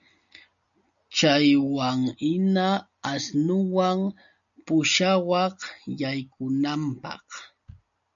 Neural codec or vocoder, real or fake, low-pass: none; real; 7.2 kHz